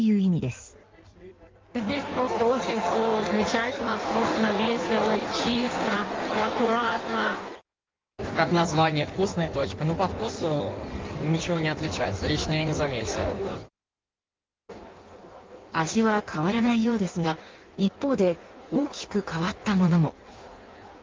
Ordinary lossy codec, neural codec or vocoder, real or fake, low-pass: Opus, 32 kbps; codec, 16 kHz in and 24 kHz out, 1.1 kbps, FireRedTTS-2 codec; fake; 7.2 kHz